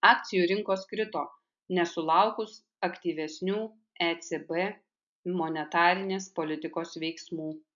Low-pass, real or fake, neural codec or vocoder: 7.2 kHz; real; none